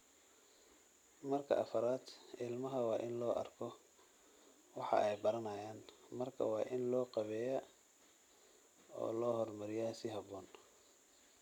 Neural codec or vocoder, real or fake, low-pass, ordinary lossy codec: none; real; 19.8 kHz; none